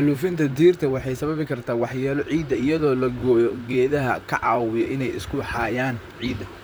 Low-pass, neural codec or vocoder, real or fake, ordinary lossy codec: none; vocoder, 44.1 kHz, 128 mel bands, Pupu-Vocoder; fake; none